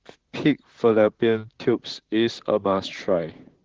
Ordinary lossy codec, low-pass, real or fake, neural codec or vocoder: Opus, 16 kbps; 7.2 kHz; fake; vocoder, 44.1 kHz, 128 mel bands, Pupu-Vocoder